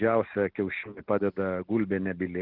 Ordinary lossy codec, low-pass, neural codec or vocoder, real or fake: Opus, 16 kbps; 5.4 kHz; none; real